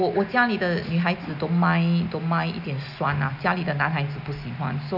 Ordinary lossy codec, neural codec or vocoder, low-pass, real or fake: none; none; 5.4 kHz; real